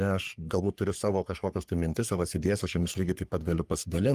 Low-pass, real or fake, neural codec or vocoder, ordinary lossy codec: 14.4 kHz; fake; codec, 44.1 kHz, 3.4 kbps, Pupu-Codec; Opus, 32 kbps